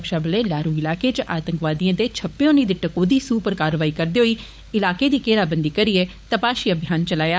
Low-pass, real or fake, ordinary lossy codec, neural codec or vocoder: none; fake; none; codec, 16 kHz, 16 kbps, FunCodec, trained on LibriTTS, 50 frames a second